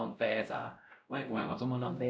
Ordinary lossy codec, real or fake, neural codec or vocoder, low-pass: none; fake; codec, 16 kHz, 0.5 kbps, X-Codec, WavLM features, trained on Multilingual LibriSpeech; none